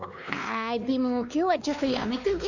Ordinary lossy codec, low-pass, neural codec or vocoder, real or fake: none; 7.2 kHz; codec, 16 kHz, 2 kbps, X-Codec, WavLM features, trained on Multilingual LibriSpeech; fake